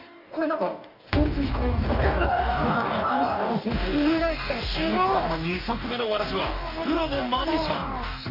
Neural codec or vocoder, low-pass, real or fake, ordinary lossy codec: codec, 44.1 kHz, 2.6 kbps, DAC; 5.4 kHz; fake; none